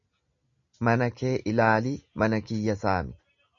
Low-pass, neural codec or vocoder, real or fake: 7.2 kHz; none; real